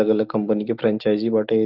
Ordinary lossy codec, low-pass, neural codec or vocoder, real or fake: Opus, 24 kbps; 5.4 kHz; none; real